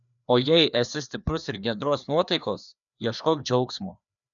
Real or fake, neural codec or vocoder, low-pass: fake; codec, 16 kHz, 2 kbps, FreqCodec, larger model; 7.2 kHz